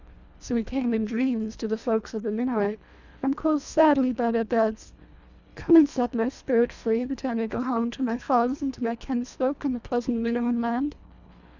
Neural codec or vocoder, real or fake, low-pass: codec, 24 kHz, 1.5 kbps, HILCodec; fake; 7.2 kHz